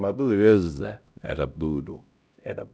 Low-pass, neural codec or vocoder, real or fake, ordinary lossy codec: none; codec, 16 kHz, 0.5 kbps, X-Codec, HuBERT features, trained on LibriSpeech; fake; none